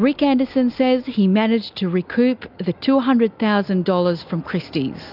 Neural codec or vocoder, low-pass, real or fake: none; 5.4 kHz; real